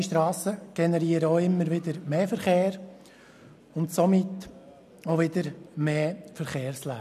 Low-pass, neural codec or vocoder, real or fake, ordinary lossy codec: 14.4 kHz; none; real; none